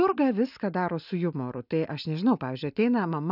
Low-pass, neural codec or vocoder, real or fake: 5.4 kHz; none; real